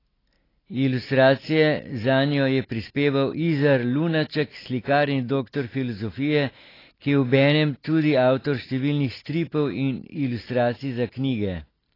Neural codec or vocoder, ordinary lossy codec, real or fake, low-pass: none; AAC, 24 kbps; real; 5.4 kHz